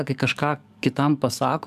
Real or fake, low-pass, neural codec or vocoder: fake; 14.4 kHz; codec, 44.1 kHz, 7.8 kbps, DAC